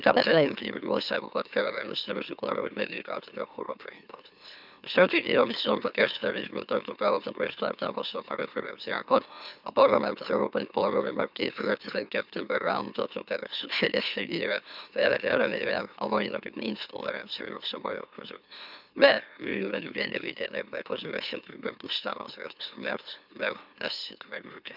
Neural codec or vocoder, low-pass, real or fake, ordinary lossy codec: autoencoder, 44.1 kHz, a latent of 192 numbers a frame, MeloTTS; 5.4 kHz; fake; none